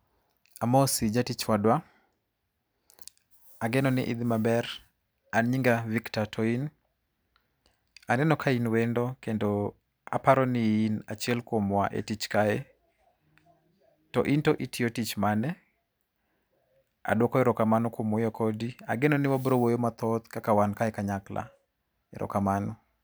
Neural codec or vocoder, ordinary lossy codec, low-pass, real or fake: none; none; none; real